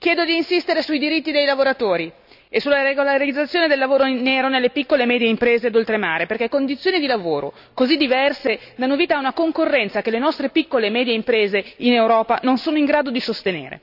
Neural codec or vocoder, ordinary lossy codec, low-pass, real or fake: none; none; 5.4 kHz; real